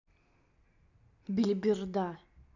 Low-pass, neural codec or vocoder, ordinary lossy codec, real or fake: 7.2 kHz; none; none; real